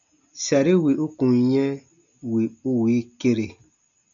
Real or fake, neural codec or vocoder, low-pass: real; none; 7.2 kHz